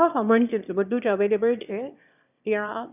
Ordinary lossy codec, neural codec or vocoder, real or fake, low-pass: none; autoencoder, 22.05 kHz, a latent of 192 numbers a frame, VITS, trained on one speaker; fake; 3.6 kHz